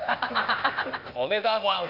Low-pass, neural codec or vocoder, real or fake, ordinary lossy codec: 5.4 kHz; codec, 16 kHz, 0.8 kbps, ZipCodec; fake; none